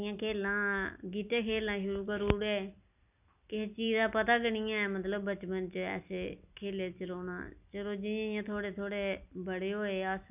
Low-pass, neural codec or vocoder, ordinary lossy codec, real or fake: 3.6 kHz; none; none; real